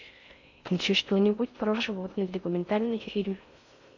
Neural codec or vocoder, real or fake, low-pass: codec, 16 kHz in and 24 kHz out, 0.6 kbps, FocalCodec, streaming, 4096 codes; fake; 7.2 kHz